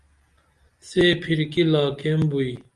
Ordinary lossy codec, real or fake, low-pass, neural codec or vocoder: Opus, 32 kbps; real; 10.8 kHz; none